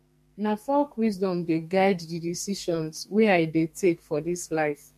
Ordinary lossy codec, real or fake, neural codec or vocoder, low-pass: MP3, 64 kbps; fake; codec, 44.1 kHz, 2.6 kbps, SNAC; 14.4 kHz